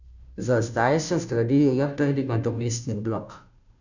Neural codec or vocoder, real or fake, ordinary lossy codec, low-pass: codec, 16 kHz, 0.5 kbps, FunCodec, trained on Chinese and English, 25 frames a second; fake; none; 7.2 kHz